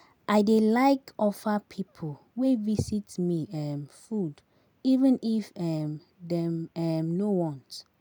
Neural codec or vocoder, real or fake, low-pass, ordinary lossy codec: none; real; none; none